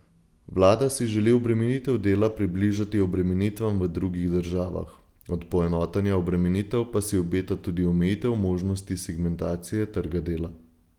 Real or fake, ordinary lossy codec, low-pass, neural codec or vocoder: real; Opus, 24 kbps; 19.8 kHz; none